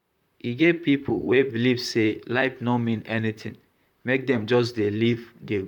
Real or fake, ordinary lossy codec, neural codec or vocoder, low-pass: fake; none; vocoder, 44.1 kHz, 128 mel bands, Pupu-Vocoder; 19.8 kHz